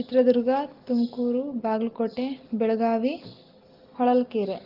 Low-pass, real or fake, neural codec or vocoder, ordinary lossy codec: 5.4 kHz; real; none; Opus, 16 kbps